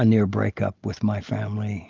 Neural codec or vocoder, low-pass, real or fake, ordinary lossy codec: none; 7.2 kHz; real; Opus, 24 kbps